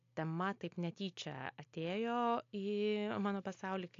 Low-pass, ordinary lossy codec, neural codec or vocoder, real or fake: 7.2 kHz; AAC, 48 kbps; none; real